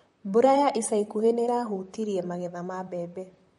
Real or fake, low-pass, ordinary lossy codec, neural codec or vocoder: fake; 19.8 kHz; MP3, 48 kbps; vocoder, 44.1 kHz, 128 mel bands, Pupu-Vocoder